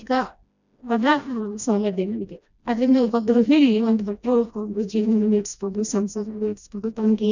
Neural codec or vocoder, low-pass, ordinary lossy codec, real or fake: codec, 16 kHz, 1 kbps, FreqCodec, smaller model; 7.2 kHz; none; fake